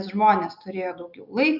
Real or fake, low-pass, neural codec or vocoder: real; 5.4 kHz; none